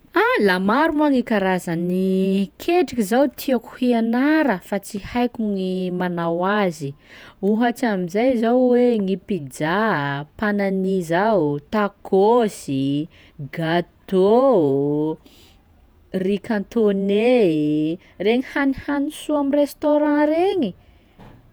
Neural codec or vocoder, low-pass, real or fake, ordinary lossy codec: vocoder, 48 kHz, 128 mel bands, Vocos; none; fake; none